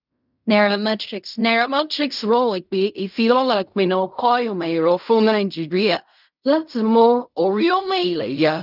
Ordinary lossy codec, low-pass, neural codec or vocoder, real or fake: none; 5.4 kHz; codec, 16 kHz in and 24 kHz out, 0.4 kbps, LongCat-Audio-Codec, fine tuned four codebook decoder; fake